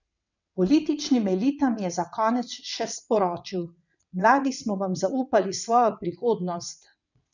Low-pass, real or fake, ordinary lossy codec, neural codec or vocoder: 7.2 kHz; fake; none; vocoder, 22.05 kHz, 80 mel bands, WaveNeXt